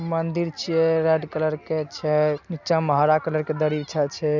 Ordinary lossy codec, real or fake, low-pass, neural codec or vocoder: none; real; 7.2 kHz; none